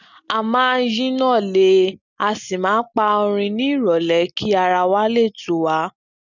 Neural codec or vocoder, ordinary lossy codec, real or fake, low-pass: none; none; real; 7.2 kHz